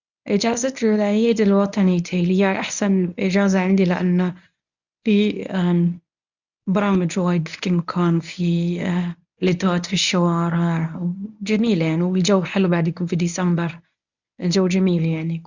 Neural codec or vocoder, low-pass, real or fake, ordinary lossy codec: codec, 24 kHz, 0.9 kbps, WavTokenizer, medium speech release version 1; 7.2 kHz; fake; none